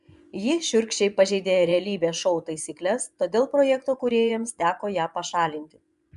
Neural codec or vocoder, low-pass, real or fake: vocoder, 24 kHz, 100 mel bands, Vocos; 10.8 kHz; fake